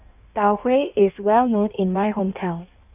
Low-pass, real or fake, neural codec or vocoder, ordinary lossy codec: 3.6 kHz; fake; codec, 16 kHz in and 24 kHz out, 1.1 kbps, FireRedTTS-2 codec; none